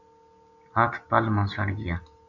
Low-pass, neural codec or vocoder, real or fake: 7.2 kHz; none; real